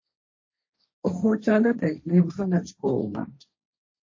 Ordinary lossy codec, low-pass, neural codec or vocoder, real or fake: MP3, 32 kbps; 7.2 kHz; codec, 16 kHz, 1.1 kbps, Voila-Tokenizer; fake